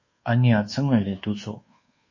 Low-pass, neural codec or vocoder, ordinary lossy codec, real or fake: 7.2 kHz; codec, 24 kHz, 1.2 kbps, DualCodec; MP3, 32 kbps; fake